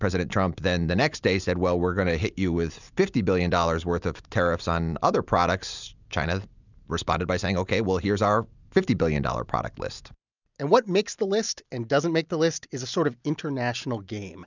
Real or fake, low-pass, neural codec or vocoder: real; 7.2 kHz; none